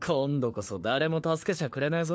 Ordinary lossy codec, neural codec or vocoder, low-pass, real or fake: none; codec, 16 kHz, 4 kbps, FunCodec, trained on LibriTTS, 50 frames a second; none; fake